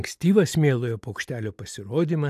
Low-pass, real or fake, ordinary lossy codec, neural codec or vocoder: 14.4 kHz; real; AAC, 96 kbps; none